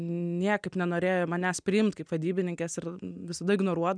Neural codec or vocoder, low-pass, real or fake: none; 9.9 kHz; real